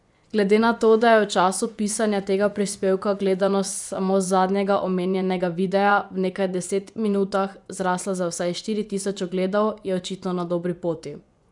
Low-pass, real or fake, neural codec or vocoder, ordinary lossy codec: 10.8 kHz; fake; vocoder, 24 kHz, 100 mel bands, Vocos; none